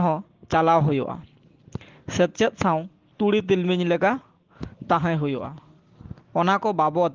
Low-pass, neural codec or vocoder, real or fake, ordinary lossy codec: 7.2 kHz; none; real; Opus, 16 kbps